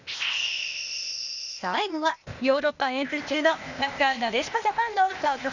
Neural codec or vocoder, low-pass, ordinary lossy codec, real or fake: codec, 16 kHz, 0.8 kbps, ZipCodec; 7.2 kHz; none; fake